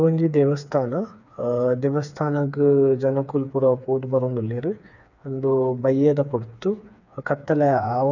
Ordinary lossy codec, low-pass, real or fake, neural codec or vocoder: none; 7.2 kHz; fake; codec, 16 kHz, 4 kbps, FreqCodec, smaller model